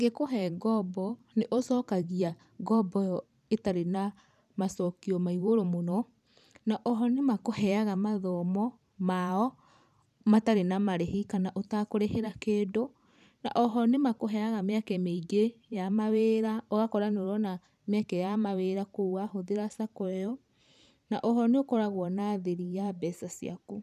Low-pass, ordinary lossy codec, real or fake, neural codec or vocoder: 14.4 kHz; none; fake; vocoder, 44.1 kHz, 128 mel bands every 512 samples, BigVGAN v2